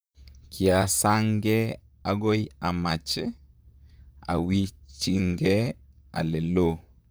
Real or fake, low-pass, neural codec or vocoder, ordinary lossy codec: fake; none; vocoder, 44.1 kHz, 128 mel bands, Pupu-Vocoder; none